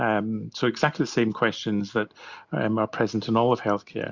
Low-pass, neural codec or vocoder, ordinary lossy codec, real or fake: 7.2 kHz; none; Opus, 64 kbps; real